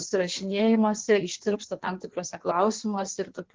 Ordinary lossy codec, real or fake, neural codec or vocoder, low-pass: Opus, 16 kbps; fake; codec, 16 kHz in and 24 kHz out, 1.1 kbps, FireRedTTS-2 codec; 7.2 kHz